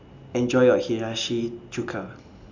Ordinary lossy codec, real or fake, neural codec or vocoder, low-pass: none; real; none; 7.2 kHz